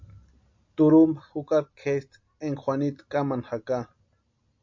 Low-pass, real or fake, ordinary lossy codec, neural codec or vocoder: 7.2 kHz; real; MP3, 48 kbps; none